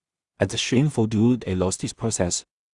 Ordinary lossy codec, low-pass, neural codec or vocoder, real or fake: Opus, 64 kbps; 10.8 kHz; codec, 16 kHz in and 24 kHz out, 0.4 kbps, LongCat-Audio-Codec, two codebook decoder; fake